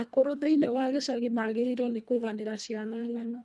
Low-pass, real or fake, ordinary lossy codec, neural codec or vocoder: none; fake; none; codec, 24 kHz, 1.5 kbps, HILCodec